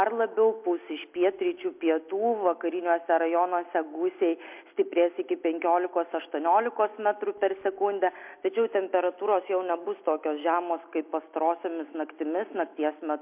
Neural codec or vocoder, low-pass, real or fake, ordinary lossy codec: none; 3.6 kHz; real; MP3, 32 kbps